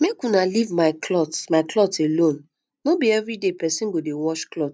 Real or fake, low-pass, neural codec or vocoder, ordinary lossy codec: real; none; none; none